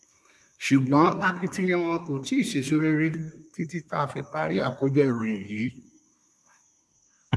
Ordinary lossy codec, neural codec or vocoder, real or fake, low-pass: none; codec, 24 kHz, 1 kbps, SNAC; fake; none